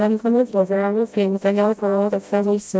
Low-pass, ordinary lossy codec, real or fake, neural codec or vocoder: none; none; fake; codec, 16 kHz, 0.5 kbps, FreqCodec, smaller model